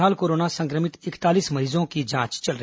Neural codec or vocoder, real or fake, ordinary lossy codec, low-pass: none; real; none; none